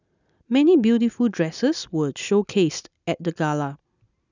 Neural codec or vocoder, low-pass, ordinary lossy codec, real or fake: none; 7.2 kHz; none; real